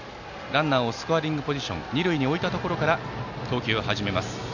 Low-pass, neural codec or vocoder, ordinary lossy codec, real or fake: 7.2 kHz; none; none; real